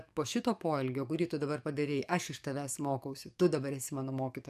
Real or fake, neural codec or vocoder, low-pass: fake; codec, 44.1 kHz, 7.8 kbps, DAC; 14.4 kHz